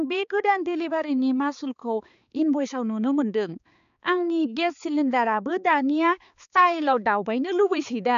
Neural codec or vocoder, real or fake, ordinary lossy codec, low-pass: codec, 16 kHz, 4 kbps, X-Codec, HuBERT features, trained on balanced general audio; fake; none; 7.2 kHz